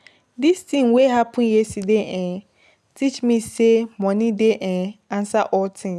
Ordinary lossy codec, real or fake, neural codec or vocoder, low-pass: none; real; none; none